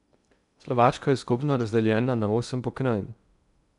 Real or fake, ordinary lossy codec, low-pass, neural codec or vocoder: fake; none; 10.8 kHz; codec, 16 kHz in and 24 kHz out, 0.6 kbps, FocalCodec, streaming, 2048 codes